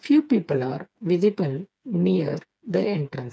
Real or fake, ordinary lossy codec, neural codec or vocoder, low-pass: fake; none; codec, 16 kHz, 4 kbps, FreqCodec, smaller model; none